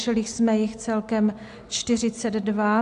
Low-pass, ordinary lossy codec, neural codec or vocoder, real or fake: 10.8 kHz; AAC, 64 kbps; none; real